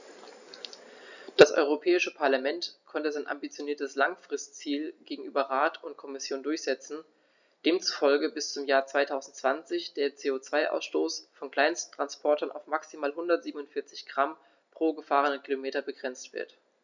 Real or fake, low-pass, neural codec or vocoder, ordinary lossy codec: real; 7.2 kHz; none; none